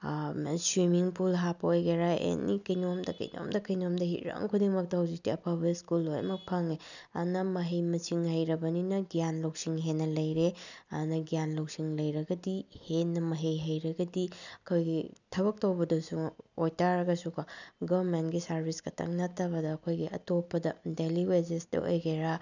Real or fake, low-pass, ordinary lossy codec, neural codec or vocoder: real; 7.2 kHz; none; none